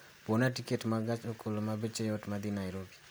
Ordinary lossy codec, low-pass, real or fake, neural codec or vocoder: none; none; real; none